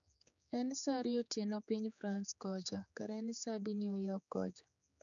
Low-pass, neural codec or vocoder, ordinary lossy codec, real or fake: 7.2 kHz; codec, 16 kHz, 4 kbps, X-Codec, HuBERT features, trained on general audio; none; fake